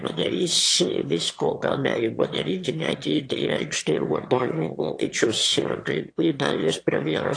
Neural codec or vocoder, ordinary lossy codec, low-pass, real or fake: autoencoder, 22.05 kHz, a latent of 192 numbers a frame, VITS, trained on one speaker; AAC, 48 kbps; 9.9 kHz; fake